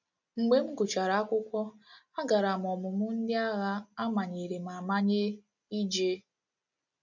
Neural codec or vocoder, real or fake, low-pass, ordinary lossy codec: none; real; 7.2 kHz; none